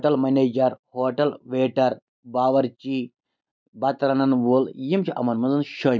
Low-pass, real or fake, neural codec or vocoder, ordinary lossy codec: none; real; none; none